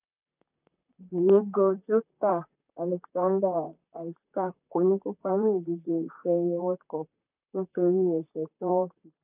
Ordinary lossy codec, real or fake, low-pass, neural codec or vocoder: none; fake; 3.6 kHz; vocoder, 44.1 kHz, 128 mel bands, Pupu-Vocoder